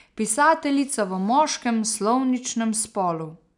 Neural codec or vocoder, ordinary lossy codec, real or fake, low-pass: none; none; real; 10.8 kHz